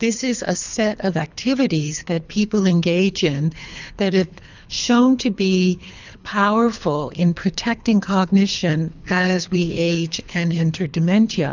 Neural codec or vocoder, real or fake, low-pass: codec, 24 kHz, 3 kbps, HILCodec; fake; 7.2 kHz